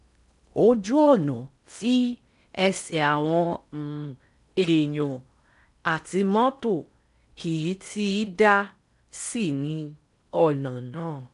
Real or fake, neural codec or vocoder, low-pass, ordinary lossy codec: fake; codec, 16 kHz in and 24 kHz out, 0.6 kbps, FocalCodec, streaming, 4096 codes; 10.8 kHz; none